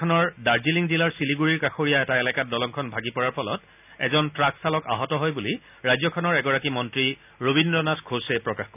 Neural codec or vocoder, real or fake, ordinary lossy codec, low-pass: none; real; none; 3.6 kHz